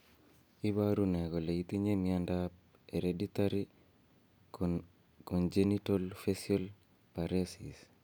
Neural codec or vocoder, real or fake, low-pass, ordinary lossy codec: none; real; none; none